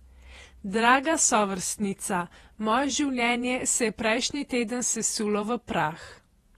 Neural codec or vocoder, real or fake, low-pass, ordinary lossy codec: vocoder, 48 kHz, 128 mel bands, Vocos; fake; 19.8 kHz; AAC, 32 kbps